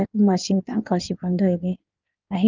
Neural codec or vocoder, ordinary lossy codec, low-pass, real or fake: codec, 16 kHz in and 24 kHz out, 1.1 kbps, FireRedTTS-2 codec; Opus, 32 kbps; 7.2 kHz; fake